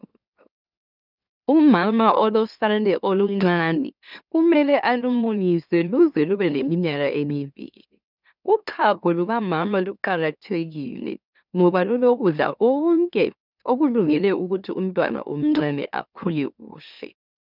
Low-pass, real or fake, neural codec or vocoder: 5.4 kHz; fake; autoencoder, 44.1 kHz, a latent of 192 numbers a frame, MeloTTS